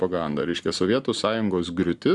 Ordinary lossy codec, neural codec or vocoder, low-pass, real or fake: Opus, 64 kbps; none; 10.8 kHz; real